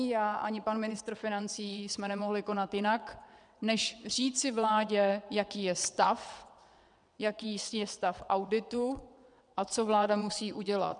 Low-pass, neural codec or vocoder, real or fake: 9.9 kHz; vocoder, 22.05 kHz, 80 mel bands, WaveNeXt; fake